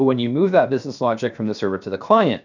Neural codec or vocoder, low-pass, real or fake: codec, 16 kHz, 0.7 kbps, FocalCodec; 7.2 kHz; fake